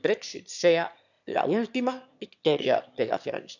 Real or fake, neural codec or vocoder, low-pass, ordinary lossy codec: fake; autoencoder, 22.05 kHz, a latent of 192 numbers a frame, VITS, trained on one speaker; 7.2 kHz; none